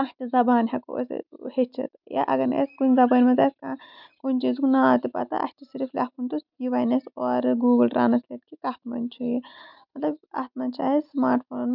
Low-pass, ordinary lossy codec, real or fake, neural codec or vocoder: 5.4 kHz; none; real; none